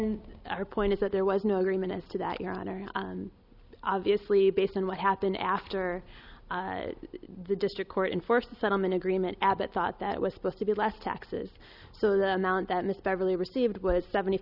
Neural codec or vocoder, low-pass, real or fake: none; 5.4 kHz; real